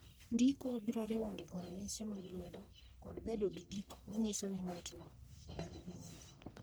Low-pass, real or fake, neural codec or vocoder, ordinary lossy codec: none; fake; codec, 44.1 kHz, 1.7 kbps, Pupu-Codec; none